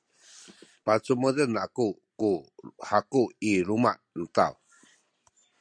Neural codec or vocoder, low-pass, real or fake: none; 9.9 kHz; real